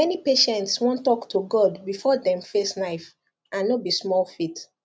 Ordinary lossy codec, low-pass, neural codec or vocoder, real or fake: none; none; none; real